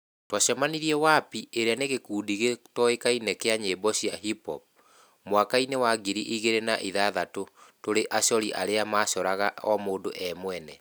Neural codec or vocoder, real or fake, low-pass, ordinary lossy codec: none; real; none; none